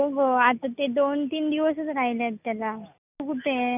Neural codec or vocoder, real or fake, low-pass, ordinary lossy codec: none; real; 3.6 kHz; none